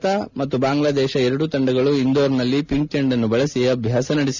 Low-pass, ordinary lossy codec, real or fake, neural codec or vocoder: 7.2 kHz; none; real; none